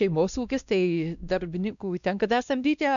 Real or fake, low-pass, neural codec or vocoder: fake; 7.2 kHz; codec, 16 kHz, 1 kbps, X-Codec, WavLM features, trained on Multilingual LibriSpeech